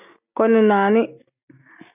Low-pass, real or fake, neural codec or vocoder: 3.6 kHz; real; none